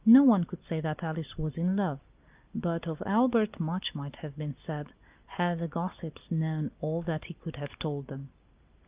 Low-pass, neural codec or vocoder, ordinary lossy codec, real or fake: 3.6 kHz; none; Opus, 24 kbps; real